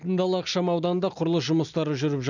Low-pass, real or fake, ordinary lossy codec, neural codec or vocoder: 7.2 kHz; real; none; none